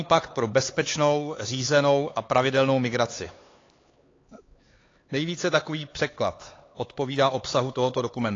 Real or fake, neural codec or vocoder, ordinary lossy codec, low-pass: fake; codec, 16 kHz, 4 kbps, X-Codec, HuBERT features, trained on LibriSpeech; AAC, 32 kbps; 7.2 kHz